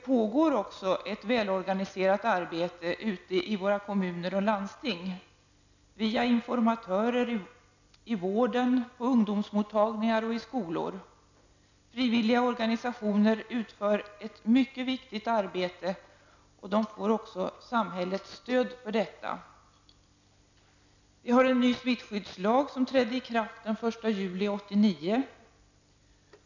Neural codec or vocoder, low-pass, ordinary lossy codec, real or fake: none; 7.2 kHz; none; real